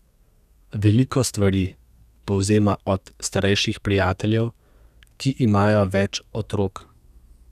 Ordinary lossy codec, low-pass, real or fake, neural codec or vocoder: none; 14.4 kHz; fake; codec, 32 kHz, 1.9 kbps, SNAC